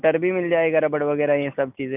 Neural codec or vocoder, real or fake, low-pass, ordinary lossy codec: none; real; 3.6 kHz; none